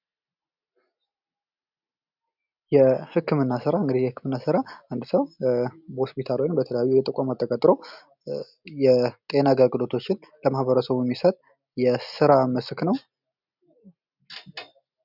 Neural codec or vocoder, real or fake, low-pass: none; real; 5.4 kHz